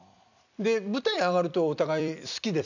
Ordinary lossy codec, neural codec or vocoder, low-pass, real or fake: none; vocoder, 44.1 kHz, 128 mel bands every 256 samples, BigVGAN v2; 7.2 kHz; fake